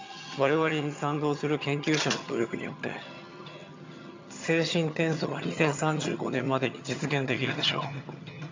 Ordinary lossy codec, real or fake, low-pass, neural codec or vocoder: MP3, 64 kbps; fake; 7.2 kHz; vocoder, 22.05 kHz, 80 mel bands, HiFi-GAN